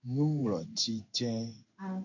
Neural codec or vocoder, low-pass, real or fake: codec, 16 kHz in and 24 kHz out, 1 kbps, XY-Tokenizer; 7.2 kHz; fake